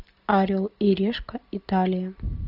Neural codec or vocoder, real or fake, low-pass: none; real; 5.4 kHz